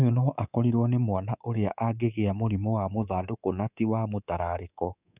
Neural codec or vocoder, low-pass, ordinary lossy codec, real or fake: codec, 24 kHz, 3.1 kbps, DualCodec; 3.6 kHz; none; fake